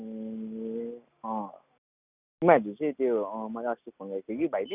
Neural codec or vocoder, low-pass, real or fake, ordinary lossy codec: none; 3.6 kHz; real; none